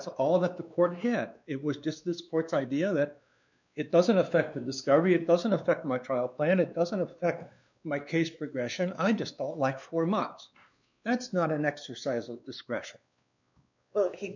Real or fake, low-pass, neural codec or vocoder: fake; 7.2 kHz; codec, 16 kHz, 2 kbps, X-Codec, WavLM features, trained on Multilingual LibriSpeech